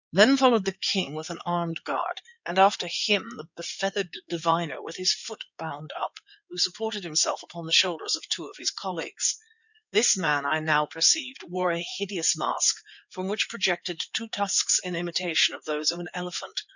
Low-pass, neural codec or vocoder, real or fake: 7.2 kHz; codec, 16 kHz in and 24 kHz out, 2.2 kbps, FireRedTTS-2 codec; fake